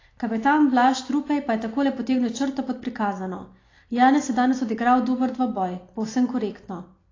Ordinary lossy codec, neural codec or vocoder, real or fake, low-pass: AAC, 32 kbps; none; real; 7.2 kHz